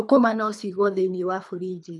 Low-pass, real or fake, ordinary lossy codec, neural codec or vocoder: none; fake; none; codec, 24 kHz, 3 kbps, HILCodec